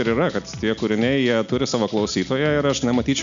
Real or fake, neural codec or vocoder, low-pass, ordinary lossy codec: real; none; 7.2 kHz; MP3, 64 kbps